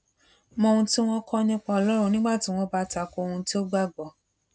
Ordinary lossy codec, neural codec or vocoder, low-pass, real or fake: none; none; none; real